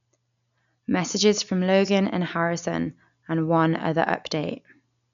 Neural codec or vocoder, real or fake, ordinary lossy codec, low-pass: none; real; none; 7.2 kHz